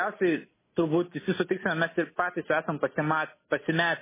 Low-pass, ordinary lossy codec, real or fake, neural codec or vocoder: 3.6 kHz; MP3, 16 kbps; real; none